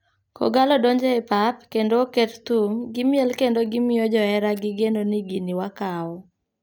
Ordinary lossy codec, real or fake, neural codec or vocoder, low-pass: none; real; none; none